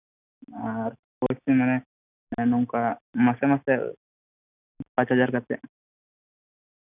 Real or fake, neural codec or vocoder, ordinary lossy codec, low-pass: real; none; none; 3.6 kHz